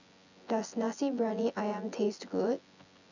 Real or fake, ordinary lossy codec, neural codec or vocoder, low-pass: fake; none; vocoder, 24 kHz, 100 mel bands, Vocos; 7.2 kHz